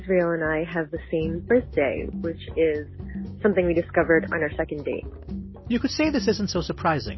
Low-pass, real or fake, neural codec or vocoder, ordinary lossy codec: 7.2 kHz; real; none; MP3, 24 kbps